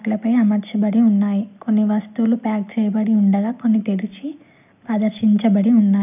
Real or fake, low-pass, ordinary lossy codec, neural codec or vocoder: real; 3.6 kHz; none; none